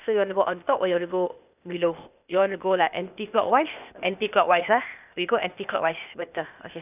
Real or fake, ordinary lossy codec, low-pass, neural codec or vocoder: fake; none; 3.6 kHz; codec, 16 kHz, 0.8 kbps, ZipCodec